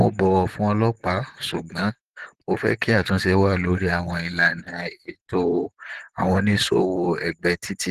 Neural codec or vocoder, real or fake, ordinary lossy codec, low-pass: vocoder, 44.1 kHz, 128 mel bands, Pupu-Vocoder; fake; Opus, 16 kbps; 14.4 kHz